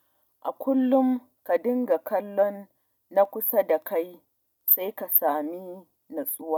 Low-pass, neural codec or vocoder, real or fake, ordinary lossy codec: 19.8 kHz; none; real; none